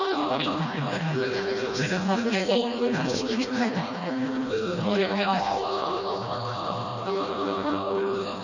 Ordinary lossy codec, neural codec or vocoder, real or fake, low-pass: none; codec, 16 kHz, 1 kbps, FreqCodec, smaller model; fake; 7.2 kHz